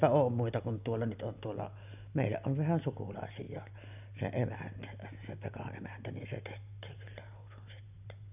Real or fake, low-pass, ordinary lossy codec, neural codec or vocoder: real; 3.6 kHz; none; none